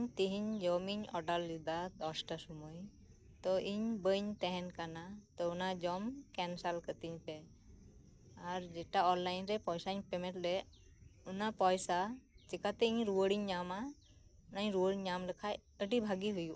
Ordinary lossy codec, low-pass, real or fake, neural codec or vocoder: none; none; real; none